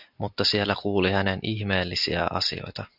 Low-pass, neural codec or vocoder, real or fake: 5.4 kHz; none; real